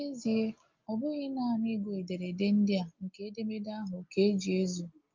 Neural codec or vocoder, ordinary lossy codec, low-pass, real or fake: none; Opus, 24 kbps; 7.2 kHz; real